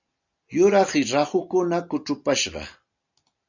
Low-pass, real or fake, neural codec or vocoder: 7.2 kHz; real; none